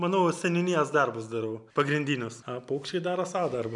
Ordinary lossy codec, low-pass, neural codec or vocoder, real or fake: MP3, 96 kbps; 10.8 kHz; none; real